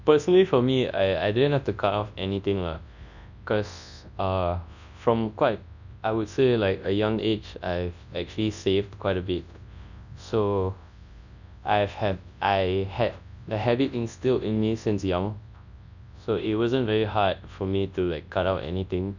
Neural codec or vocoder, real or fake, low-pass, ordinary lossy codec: codec, 24 kHz, 0.9 kbps, WavTokenizer, large speech release; fake; 7.2 kHz; none